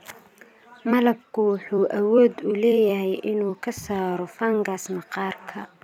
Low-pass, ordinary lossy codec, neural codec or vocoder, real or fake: 19.8 kHz; none; vocoder, 44.1 kHz, 128 mel bands every 256 samples, BigVGAN v2; fake